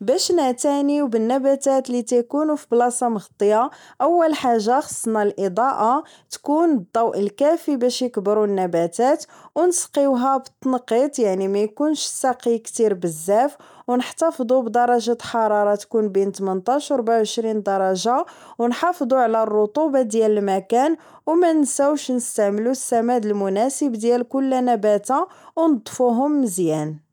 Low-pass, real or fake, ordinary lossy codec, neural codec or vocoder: 19.8 kHz; real; none; none